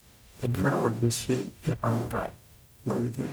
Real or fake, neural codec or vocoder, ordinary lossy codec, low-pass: fake; codec, 44.1 kHz, 0.9 kbps, DAC; none; none